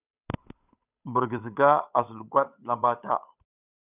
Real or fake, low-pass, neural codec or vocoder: fake; 3.6 kHz; codec, 16 kHz, 8 kbps, FunCodec, trained on Chinese and English, 25 frames a second